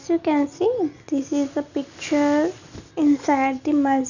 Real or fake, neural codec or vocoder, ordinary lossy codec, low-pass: real; none; none; 7.2 kHz